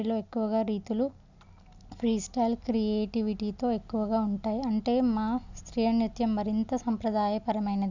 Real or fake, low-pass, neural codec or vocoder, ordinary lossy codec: real; 7.2 kHz; none; none